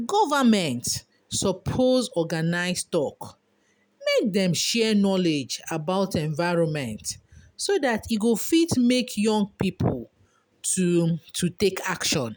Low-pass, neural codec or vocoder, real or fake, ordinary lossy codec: none; none; real; none